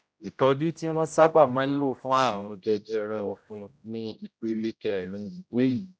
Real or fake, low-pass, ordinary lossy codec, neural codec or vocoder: fake; none; none; codec, 16 kHz, 0.5 kbps, X-Codec, HuBERT features, trained on general audio